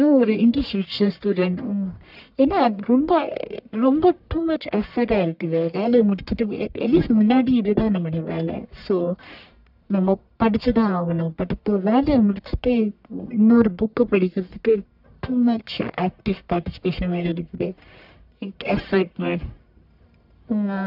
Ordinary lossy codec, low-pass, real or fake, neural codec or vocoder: none; 5.4 kHz; fake; codec, 44.1 kHz, 1.7 kbps, Pupu-Codec